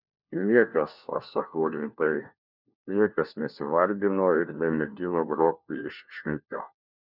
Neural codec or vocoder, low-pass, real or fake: codec, 16 kHz, 1 kbps, FunCodec, trained on LibriTTS, 50 frames a second; 5.4 kHz; fake